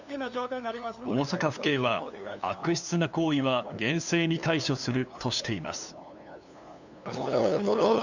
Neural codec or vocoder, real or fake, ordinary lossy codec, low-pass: codec, 16 kHz, 2 kbps, FunCodec, trained on LibriTTS, 25 frames a second; fake; none; 7.2 kHz